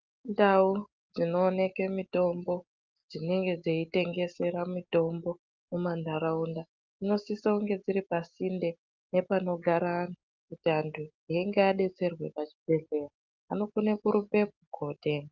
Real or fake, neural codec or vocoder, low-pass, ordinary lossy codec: real; none; 7.2 kHz; Opus, 24 kbps